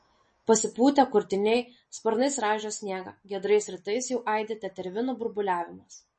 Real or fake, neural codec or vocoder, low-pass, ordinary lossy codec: real; none; 10.8 kHz; MP3, 32 kbps